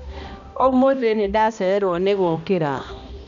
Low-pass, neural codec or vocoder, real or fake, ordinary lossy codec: 7.2 kHz; codec, 16 kHz, 1 kbps, X-Codec, HuBERT features, trained on balanced general audio; fake; none